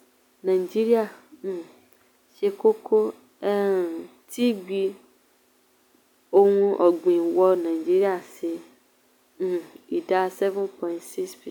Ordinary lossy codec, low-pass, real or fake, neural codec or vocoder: none; 19.8 kHz; real; none